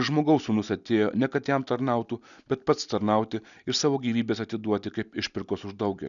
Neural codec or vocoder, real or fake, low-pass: none; real; 7.2 kHz